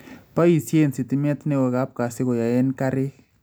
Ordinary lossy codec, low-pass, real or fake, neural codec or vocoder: none; none; real; none